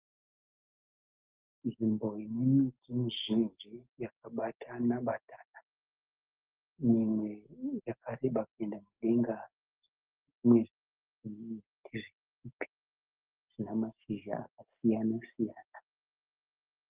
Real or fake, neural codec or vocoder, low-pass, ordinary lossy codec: real; none; 3.6 kHz; Opus, 32 kbps